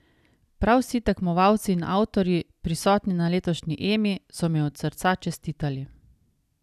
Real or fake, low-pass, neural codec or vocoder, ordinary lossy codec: real; 14.4 kHz; none; none